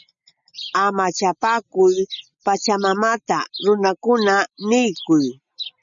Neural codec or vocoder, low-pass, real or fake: none; 7.2 kHz; real